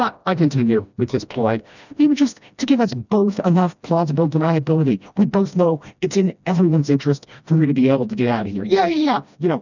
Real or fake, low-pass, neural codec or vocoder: fake; 7.2 kHz; codec, 16 kHz, 1 kbps, FreqCodec, smaller model